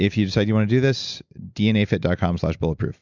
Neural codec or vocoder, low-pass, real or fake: none; 7.2 kHz; real